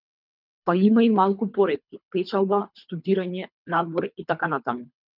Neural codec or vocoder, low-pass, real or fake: codec, 24 kHz, 3 kbps, HILCodec; 5.4 kHz; fake